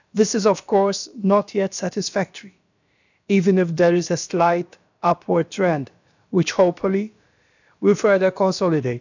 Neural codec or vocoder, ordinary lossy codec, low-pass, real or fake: codec, 16 kHz, about 1 kbps, DyCAST, with the encoder's durations; none; 7.2 kHz; fake